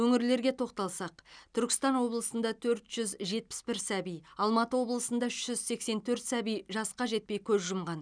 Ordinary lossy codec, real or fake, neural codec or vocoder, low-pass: none; real; none; 9.9 kHz